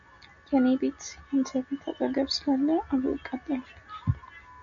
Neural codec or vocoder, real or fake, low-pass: none; real; 7.2 kHz